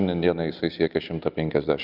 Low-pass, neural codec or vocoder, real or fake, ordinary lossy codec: 5.4 kHz; none; real; Opus, 24 kbps